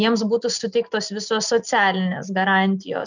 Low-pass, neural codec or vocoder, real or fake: 7.2 kHz; none; real